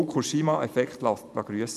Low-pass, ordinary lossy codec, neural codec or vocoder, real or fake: 14.4 kHz; none; vocoder, 44.1 kHz, 128 mel bands every 512 samples, BigVGAN v2; fake